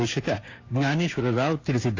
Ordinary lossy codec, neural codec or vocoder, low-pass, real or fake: AAC, 32 kbps; codec, 16 kHz, 6 kbps, DAC; 7.2 kHz; fake